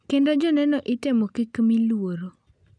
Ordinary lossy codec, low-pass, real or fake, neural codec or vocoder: none; 9.9 kHz; real; none